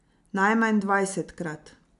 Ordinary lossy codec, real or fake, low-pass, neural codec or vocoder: none; real; 10.8 kHz; none